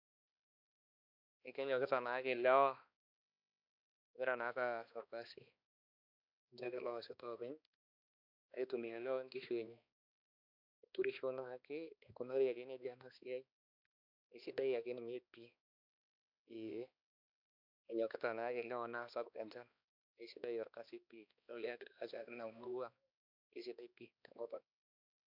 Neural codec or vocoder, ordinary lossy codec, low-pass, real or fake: codec, 16 kHz, 2 kbps, X-Codec, HuBERT features, trained on balanced general audio; MP3, 48 kbps; 5.4 kHz; fake